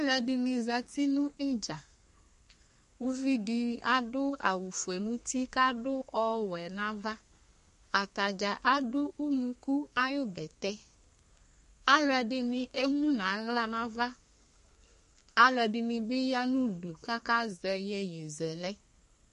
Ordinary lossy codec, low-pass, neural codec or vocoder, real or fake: MP3, 48 kbps; 14.4 kHz; codec, 32 kHz, 1.9 kbps, SNAC; fake